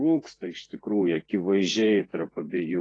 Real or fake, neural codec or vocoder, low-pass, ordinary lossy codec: fake; vocoder, 24 kHz, 100 mel bands, Vocos; 9.9 kHz; AAC, 32 kbps